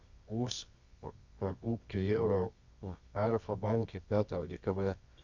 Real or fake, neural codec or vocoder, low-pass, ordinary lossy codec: fake; codec, 24 kHz, 0.9 kbps, WavTokenizer, medium music audio release; 7.2 kHz; Opus, 64 kbps